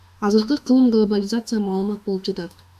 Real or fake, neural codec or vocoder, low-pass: fake; autoencoder, 48 kHz, 32 numbers a frame, DAC-VAE, trained on Japanese speech; 14.4 kHz